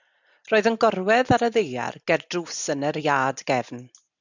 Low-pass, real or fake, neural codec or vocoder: 7.2 kHz; real; none